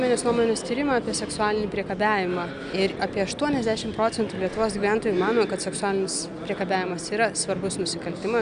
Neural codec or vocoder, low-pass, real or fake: none; 9.9 kHz; real